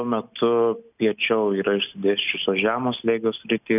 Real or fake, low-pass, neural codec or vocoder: real; 3.6 kHz; none